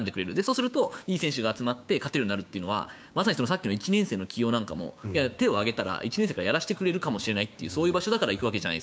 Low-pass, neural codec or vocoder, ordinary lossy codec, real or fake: none; codec, 16 kHz, 6 kbps, DAC; none; fake